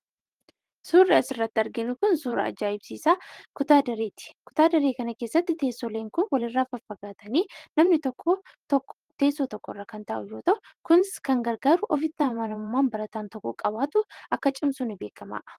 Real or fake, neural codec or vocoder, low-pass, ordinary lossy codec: fake; vocoder, 44.1 kHz, 128 mel bands every 512 samples, BigVGAN v2; 14.4 kHz; Opus, 24 kbps